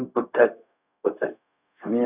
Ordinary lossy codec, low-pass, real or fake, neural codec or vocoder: none; 3.6 kHz; fake; codec, 16 kHz, 0.4 kbps, LongCat-Audio-Codec